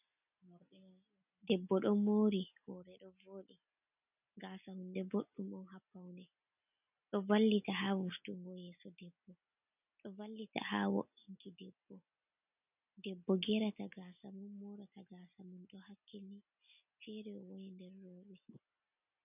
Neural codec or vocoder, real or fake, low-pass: none; real; 3.6 kHz